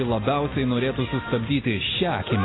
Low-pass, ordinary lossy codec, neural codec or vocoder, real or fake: 7.2 kHz; AAC, 16 kbps; none; real